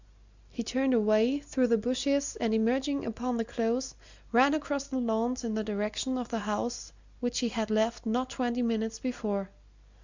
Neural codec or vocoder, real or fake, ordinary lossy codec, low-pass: none; real; Opus, 64 kbps; 7.2 kHz